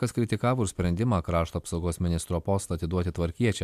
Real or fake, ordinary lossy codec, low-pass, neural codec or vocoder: real; MP3, 96 kbps; 14.4 kHz; none